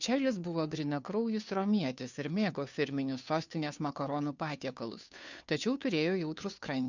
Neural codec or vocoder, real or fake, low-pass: codec, 16 kHz, 2 kbps, FunCodec, trained on Chinese and English, 25 frames a second; fake; 7.2 kHz